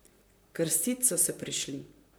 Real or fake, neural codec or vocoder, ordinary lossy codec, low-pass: fake; vocoder, 44.1 kHz, 128 mel bands, Pupu-Vocoder; none; none